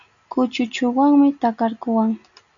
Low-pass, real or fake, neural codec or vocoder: 7.2 kHz; real; none